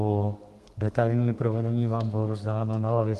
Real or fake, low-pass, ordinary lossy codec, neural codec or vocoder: fake; 14.4 kHz; Opus, 24 kbps; codec, 32 kHz, 1.9 kbps, SNAC